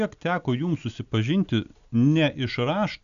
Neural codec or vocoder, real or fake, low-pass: none; real; 7.2 kHz